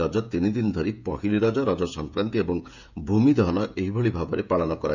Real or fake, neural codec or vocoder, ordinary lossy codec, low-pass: fake; codec, 16 kHz, 16 kbps, FreqCodec, smaller model; none; 7.2 kHz